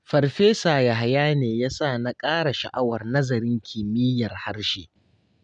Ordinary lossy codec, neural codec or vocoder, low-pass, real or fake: none; none; 9.9 kHz; real